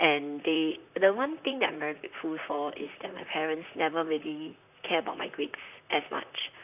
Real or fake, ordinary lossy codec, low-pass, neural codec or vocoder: fake; none; 3.6 kHz; vocoder, 44.1 kHz, 128 mel bands, Pupu-Vocoder